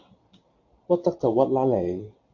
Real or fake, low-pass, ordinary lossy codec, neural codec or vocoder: real; 7.2 kHz; AAC, 48 kbps; none